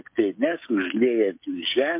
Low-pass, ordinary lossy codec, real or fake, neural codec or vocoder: 3.6 kHz; MP3, 32 kbps; fake; codec, 16 kHz, 16 kbps, FreqCodec, smaller model